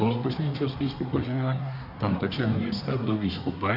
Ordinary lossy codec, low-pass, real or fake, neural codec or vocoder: AAC, 48 kbps; 5.4 kHz; fake; codec, 24 kHz, 1 kbps, SNAC